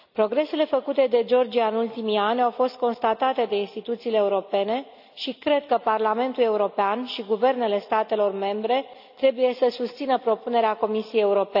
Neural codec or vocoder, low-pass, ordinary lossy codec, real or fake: none; 5.4 kHz; none; real